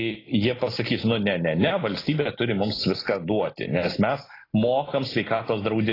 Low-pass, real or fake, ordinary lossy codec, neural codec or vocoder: 5.4 kHz; real; AAC, 24 kbps; none